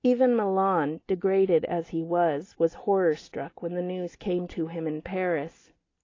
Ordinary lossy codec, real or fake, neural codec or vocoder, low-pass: AAC, 32 kbps; real; none; 7.2 kHz